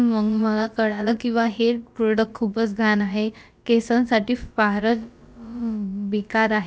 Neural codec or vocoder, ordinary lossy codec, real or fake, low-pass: codec, 16 kHz, about 1 kbps, DyCAST, with the encoder's durations; none; fake; none